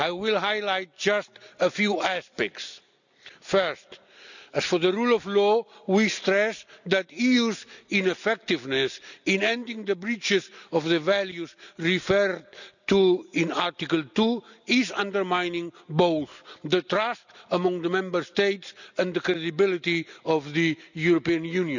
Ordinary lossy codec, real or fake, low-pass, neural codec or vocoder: none; real; 7.2 kHz; none